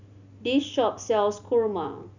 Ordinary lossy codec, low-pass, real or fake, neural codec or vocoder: none; 7.2 kHz; real; none